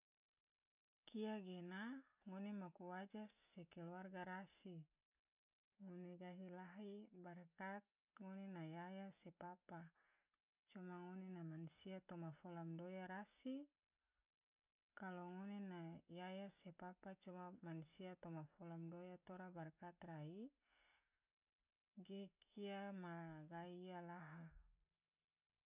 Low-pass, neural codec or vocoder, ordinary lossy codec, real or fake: 3.6 kHz; none; none; real